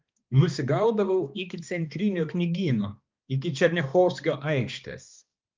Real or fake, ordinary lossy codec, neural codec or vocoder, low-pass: fake; Opus, 24 kbps; codec, 16 kHz, 2 kbps, X-Codec, HuBERT features, trained on balanced general audio; 7.2 kHz